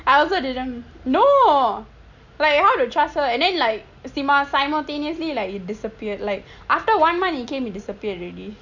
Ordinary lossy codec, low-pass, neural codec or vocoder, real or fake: none; 7.2 kHz; none; real